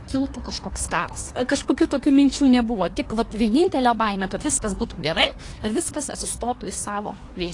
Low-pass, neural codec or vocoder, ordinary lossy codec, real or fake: 10.8 kHz; codec, 24 kHz, 1 kbps, SNAC; AAC, 48 kbps; fake